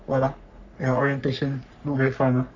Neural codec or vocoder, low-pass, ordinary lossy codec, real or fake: codec, 44.1 kHz, 3.4 kbps, Pupu-Codec; 7.2 kHz; none; fake